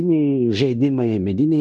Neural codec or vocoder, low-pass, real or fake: codec, 16 kHz in and 24 kHz out, 0.9 kbps, LongCat-Audio-Codec, fine tuned four codebook decoder; 10.8 kHz; fake